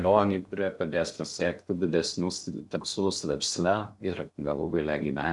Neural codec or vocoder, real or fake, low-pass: codec, 16 kHz in and 24 kHz out, 0.6 kbps, FocalCodec, streaming, 2048 codes; fake; 10.8 kHz